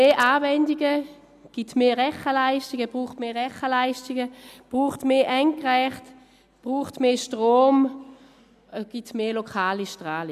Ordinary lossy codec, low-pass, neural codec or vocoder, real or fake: none; 14.4 kHz; none; real